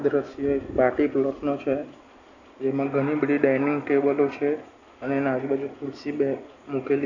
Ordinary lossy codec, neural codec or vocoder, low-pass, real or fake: none; none; 7.2 kHz; real